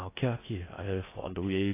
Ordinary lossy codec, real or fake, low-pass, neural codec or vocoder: AAC, 16 kbps; fake; 3.6 kHz; codec, 16 kHz in and 24 kHz out, 0.6 kbps, FocalCodec, streaming, 4096 codes